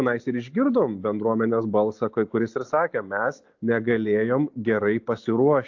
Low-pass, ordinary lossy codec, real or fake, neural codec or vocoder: 7.2 kHz; AAC, 48 kbps; real; none